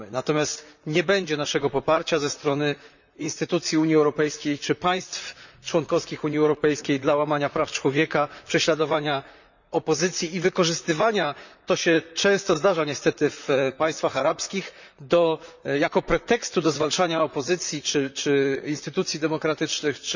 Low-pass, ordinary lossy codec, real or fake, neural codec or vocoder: 7.2 kHz; none; fake; vocoder, 44.1 kHz, 128 mel bands, Pupu-Vocoder